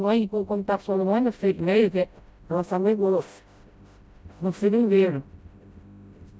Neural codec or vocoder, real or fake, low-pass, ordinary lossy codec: codec, 16 kHz, 0.5 kbps, FreqCodec, smaller model; fake; none; none